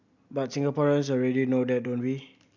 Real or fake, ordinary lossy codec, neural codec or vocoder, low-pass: real; none; none; 7.2 kHz